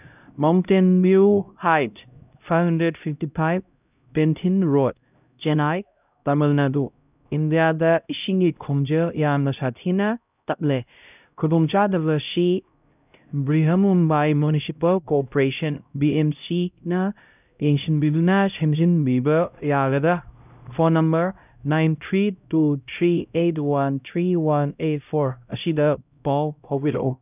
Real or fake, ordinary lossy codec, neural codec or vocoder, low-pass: fake; none; codec, 16 kHz, 0.5 kbps, X-Codec, HuBERT features, trained on LibriSpeech; 3.6 kHz